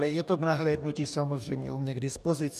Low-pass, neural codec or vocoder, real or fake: 14.4 kHz; codec, 44.1 kHz, 2.6 kbps, DAC; fake